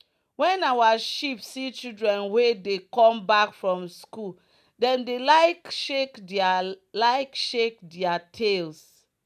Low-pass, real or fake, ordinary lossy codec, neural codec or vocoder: 14.4 kHz; real; none; none